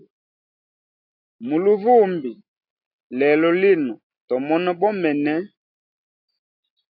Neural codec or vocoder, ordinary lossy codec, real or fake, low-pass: none; AAC, 48 kbps; real; 5.4 kHz